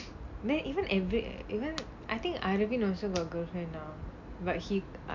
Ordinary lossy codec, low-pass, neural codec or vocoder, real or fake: MP3, 64 kbps; 7.2 kHz; none; real